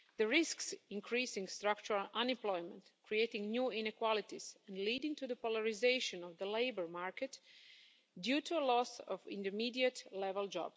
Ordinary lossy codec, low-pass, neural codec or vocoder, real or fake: none; none; none; real